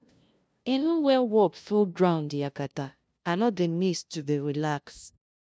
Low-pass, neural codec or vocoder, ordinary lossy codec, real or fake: none; codec, 16 kHz, 0.5 kbps, FunCodec, trained on LibriTTS, 25 frames a second; none; fake